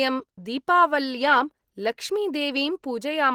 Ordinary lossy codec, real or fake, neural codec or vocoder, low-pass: Opus, 16 kbps; real; none; 19.8 kHz